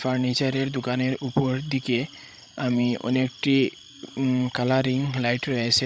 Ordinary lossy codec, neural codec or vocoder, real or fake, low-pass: none; codec, 16 kHz, 16 kbps, FreqCodec, larger model; fake; none